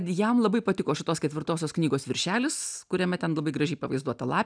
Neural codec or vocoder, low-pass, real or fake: none; 9.9 kHz; real